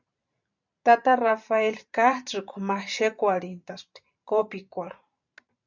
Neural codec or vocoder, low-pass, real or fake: vocoder, 44.1 kHz, 128 mel bands every 512 samples, BigVGAN v2; 7.2 kHz; fake